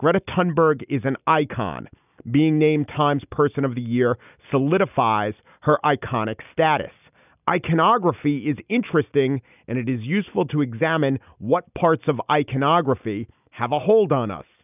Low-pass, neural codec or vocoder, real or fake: 3.6 kHz; none; real